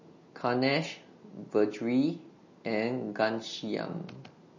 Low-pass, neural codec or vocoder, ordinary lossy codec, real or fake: 7.2 kHz; none; MP3, 32 kbps; real